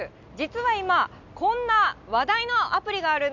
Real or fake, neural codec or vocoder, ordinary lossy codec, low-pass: real; none; none; 7.2 kHz